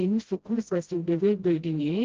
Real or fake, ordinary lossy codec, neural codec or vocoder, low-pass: fake; Opus, 16 kbps; codec, 16 kHz, 0.5 kbps, FreqCodec, smaller model; 7.2 kHz